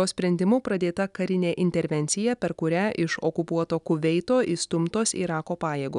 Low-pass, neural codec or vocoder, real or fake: 10.8 kHz; none; real